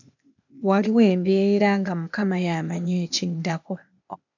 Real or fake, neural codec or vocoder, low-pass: fake; codec, 16 kHz, 0.8 kbps, ZipCodec; 7.2 kHz